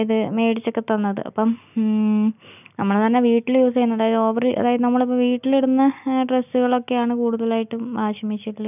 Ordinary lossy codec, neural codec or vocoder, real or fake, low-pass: none; none; real; 3.6 kHz